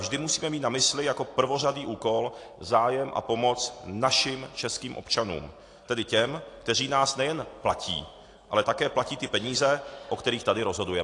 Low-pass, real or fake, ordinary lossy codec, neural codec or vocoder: 10.8 kHz; real; AAC, 48 kbps; none